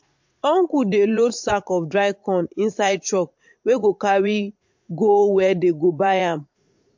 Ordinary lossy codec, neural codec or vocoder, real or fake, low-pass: MP3, 48 kbps; vocoder, 44.1 kHz, 80 mel bands, Vocos; fake; 7.2 kHz